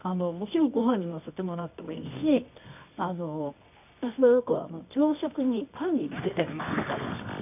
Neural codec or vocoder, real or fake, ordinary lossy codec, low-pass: codec, 24 kHz, 0.9 kbps, WavTokenizer, medium music audio release; fake; none; 3.6 kHz